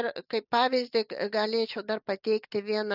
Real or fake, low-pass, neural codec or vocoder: real; 5.4 kHz; none